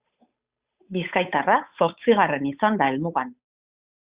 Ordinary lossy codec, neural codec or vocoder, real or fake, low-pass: Opus, 64 kbps; codec, 16 kHz, 8 kbps, FunCodec, trained on Chinese and English, 25 frames a second; fake; 3.6 kHz